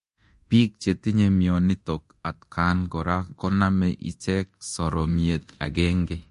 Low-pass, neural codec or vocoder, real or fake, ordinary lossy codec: 10.8 kHz; codec, 24 kHz, 0.9 kbps, DualCodec; fake; MP3, 48 kbps